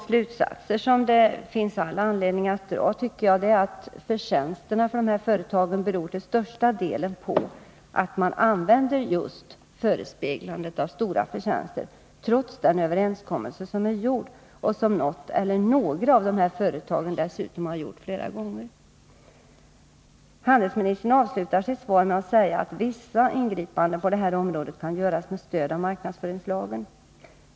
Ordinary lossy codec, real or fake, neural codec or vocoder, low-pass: none; real; none; none